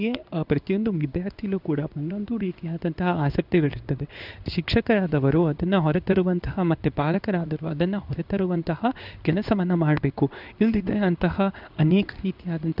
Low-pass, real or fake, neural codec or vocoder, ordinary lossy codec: 5.4 kHz; fake; codec, 16 kHz in and 24 kHz out, 1 kbps, XY-Tokenizer; none